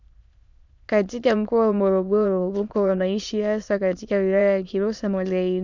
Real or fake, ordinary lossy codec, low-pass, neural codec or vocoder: fake; Opus, 64 kbps; 7.2 kHz; autoencoder, 22.05 kHz, a latent of 192 numbers a frame, VITS, trained on many speakers